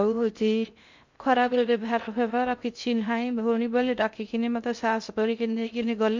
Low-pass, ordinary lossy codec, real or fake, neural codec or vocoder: 7.2 kHz; MP3, 64 kbps; fake; codec, 16 kHz in and 24 kHz out, 0.6 kbps, FocalCodec, streaming, 2048 codes